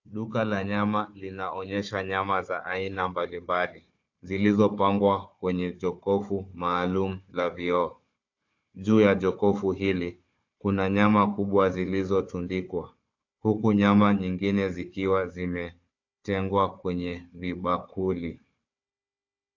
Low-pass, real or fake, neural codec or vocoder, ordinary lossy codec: 7.2 kHz; fake; codec, 16 kHz, 4 kbps, FunCodec, trained on Chinese and English, 50 frames a second; AAC, 48 kbps